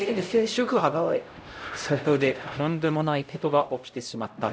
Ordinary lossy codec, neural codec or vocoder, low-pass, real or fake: none; codec, 16 kHz, 0.5 kbps, X-Codec, HuBERT features, trained on LibriSpeech; none; fake